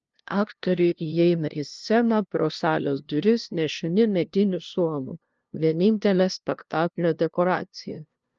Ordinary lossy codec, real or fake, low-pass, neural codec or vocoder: Opus, 24 kbps; fake; 7.2 kHz; codec, 16 kHz, 0.5 kbps, FunCodec, trained on LibriTTS, 25 frames a second